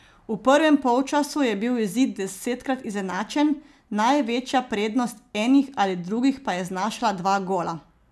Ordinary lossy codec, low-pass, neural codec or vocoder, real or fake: none; none; none; real